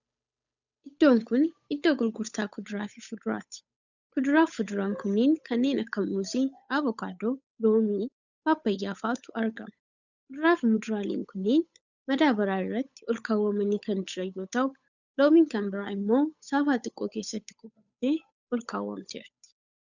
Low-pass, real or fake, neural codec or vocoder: 7.2 kHz; fake; codec, 16 kHz, 8 kbps, FunCodec, trained on Chinese and English, 25 frames a second